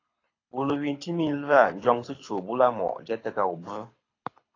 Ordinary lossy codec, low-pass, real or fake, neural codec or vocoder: AAC, 32 kbps; 7.2 kHz; fake; codec, 24 kHz, 6 kbps, HILCodec